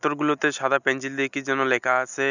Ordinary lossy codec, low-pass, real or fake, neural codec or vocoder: none; 7.2 kHz; real; none